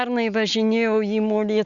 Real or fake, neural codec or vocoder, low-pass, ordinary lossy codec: real; none; 7.2 kHz; Opus, 64 kbps